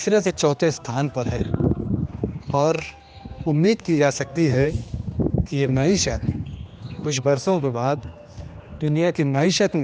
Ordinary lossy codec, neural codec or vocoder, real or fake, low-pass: none; codec, 16 kHz, 2 kbps, X-Codec, HuBERT features, trained on general audio; fake; none